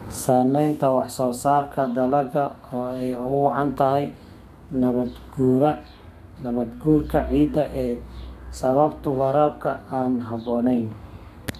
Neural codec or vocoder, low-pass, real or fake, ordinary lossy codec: codec, 32 kHz, 1.9 kbps, SNAC; 14.4 kHz; fake; none